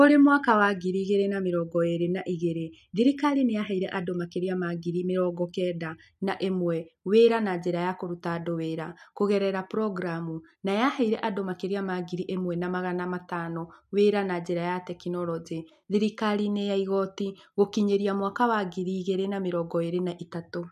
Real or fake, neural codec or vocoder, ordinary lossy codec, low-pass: real; none; none; 14.4 kHz